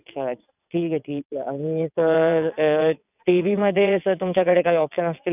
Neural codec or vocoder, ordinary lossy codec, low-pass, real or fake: vocoder, 22.05 kHz, 80 mel bands, WaveNeXt; none; 3.6 kHz; fake